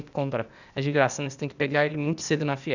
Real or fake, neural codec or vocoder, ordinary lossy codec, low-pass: fake; codec, 16 kHz, 0.8 kbps, ZipCodec; none; 7.2 kHz